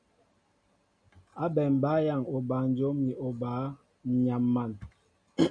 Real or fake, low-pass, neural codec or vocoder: real; 9.9 kHz; none